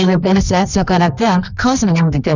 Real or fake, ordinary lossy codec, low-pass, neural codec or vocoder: fake; none; 7.2 kHz; codec, 24 kHz, 0.9 kbps, WavTokenizer, medium music audio release